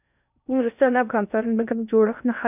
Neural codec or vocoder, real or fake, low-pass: codec, 16 kHz in and 24 kHz out, 0.6 kbps, FocalCodec, streaming, 4096 codes; fake; 3.6 kHz